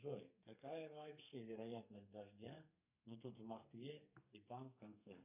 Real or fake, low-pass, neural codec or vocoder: fake; 3.6 kHz; codec, 32 kHz, 1.9 kbps, SNAC